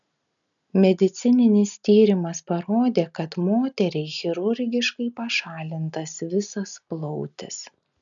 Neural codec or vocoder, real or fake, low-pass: none; real; 7.2 kHz